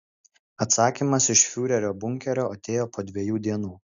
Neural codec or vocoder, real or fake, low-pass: none; real; 7.2 kHz